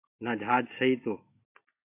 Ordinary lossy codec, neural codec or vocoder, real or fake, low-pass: AAC, 32 kbps; none; real; 3.6 kHz